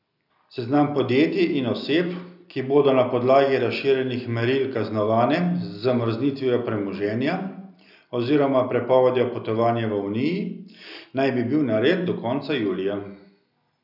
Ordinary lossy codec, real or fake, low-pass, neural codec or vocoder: none; real; 5.4 kHz; none